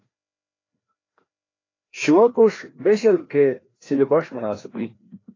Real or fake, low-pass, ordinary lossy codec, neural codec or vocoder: fake; 7.2 kHz; AAC, 32 kbps; codec, 16 kHz, 1 kbps, FreqCodec, larger model